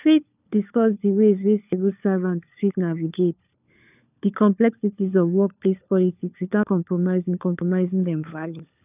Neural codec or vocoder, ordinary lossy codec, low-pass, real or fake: codec, 16 kHz, 4 kbps, FunCodec, trained on LibriTTS, 50 frames a second; none; 3.6 kHz; fake